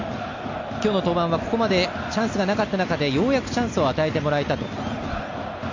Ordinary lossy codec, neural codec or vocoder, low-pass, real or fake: AAC, 48 kbps; none; 7.2 kHz; real